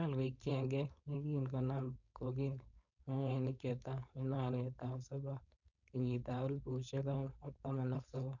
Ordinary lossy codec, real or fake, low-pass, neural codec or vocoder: none; fake; 7.2 kHz; codec, 16 kHz, 4.8 kbps, FACodec